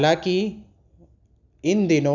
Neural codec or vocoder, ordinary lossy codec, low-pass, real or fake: none; none; 7.2 kHz; real